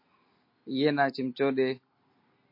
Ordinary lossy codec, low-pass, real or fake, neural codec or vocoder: MP3, 32 kbps; 5.4 kHz; real; none